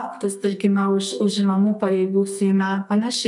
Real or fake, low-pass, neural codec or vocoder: fake; 10.8 kHz; codec, 24 kHz, 0.9 kbps, WavTokenizer, medium music audio release